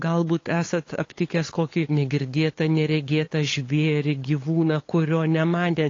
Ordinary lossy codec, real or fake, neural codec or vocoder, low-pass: AAC, 32 kbps; fake; codec, 16 kHz, 8 kbps, FunCodec, trained on LibriTTS, 25 frames a second; 7.2 kHz